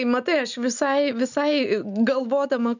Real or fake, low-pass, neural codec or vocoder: real; 7.2 kHz; none